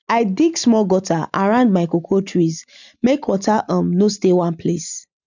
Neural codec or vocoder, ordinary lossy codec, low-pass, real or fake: none; none; 7.2 kHz; real